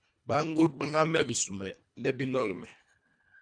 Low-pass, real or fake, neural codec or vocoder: 9.9 kHz; fake; codec, 24 kHz, 1.5 kbps, HILCodec